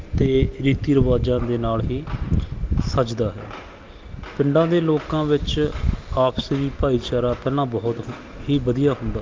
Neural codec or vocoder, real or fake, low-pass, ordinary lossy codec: none; real; 7.2 kHz; Opus, 32 kbps